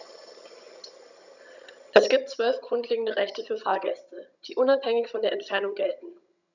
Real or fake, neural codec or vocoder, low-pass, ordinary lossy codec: fake; vocoder, 22.05 kHz, 80 mel bands, HiFi-GAN; 7.2 kHz; none